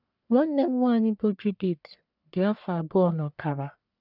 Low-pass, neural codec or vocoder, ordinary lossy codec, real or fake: 5.4 kHz; codec, 44.1 kHz, 1.7 kbps, Pupu-Codec; none; fake